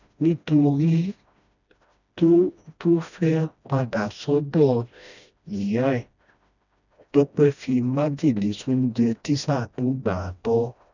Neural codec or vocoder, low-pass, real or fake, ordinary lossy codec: codec, 16 kHz, 1 kbps, FreqCodec, smaller model; 7.2 kHz; fake; none